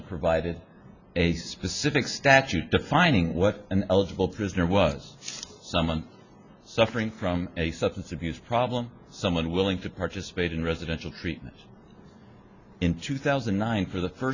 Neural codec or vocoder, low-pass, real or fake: none; 7.2 kHz; real